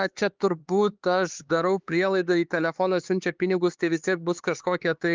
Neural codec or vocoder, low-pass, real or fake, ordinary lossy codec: codec, 16 kHz, 4 kbps, X-Codec, HuBERT features, trained on LibriSpeech; 7.2 kHz; fake; Opus, 32 kbps